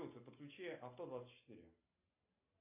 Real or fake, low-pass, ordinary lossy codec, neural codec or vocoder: real; 3.6 kHz; AAC, 32 kbps; none